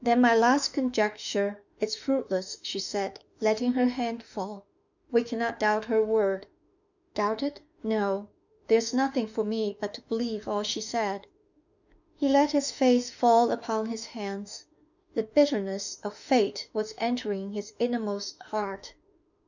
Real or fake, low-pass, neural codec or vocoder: fake; 7.2 kHz; autoencoder, 48 kHz, 32 numbers a frame, DAC-VAE, trained on Japanese speech